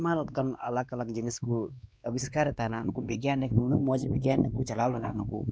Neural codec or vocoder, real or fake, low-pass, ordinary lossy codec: codec, 16 kHz, 2 kbps, X-Codec, WavLM features, trained on Multilingual LibriSpeech; fake; none; none